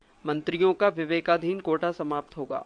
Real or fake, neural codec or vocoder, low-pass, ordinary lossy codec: fake; vocoder, 22.05 kHz, 80 mel bands, WaveNeXt; 9.9 kHz; MP3, 64 kbps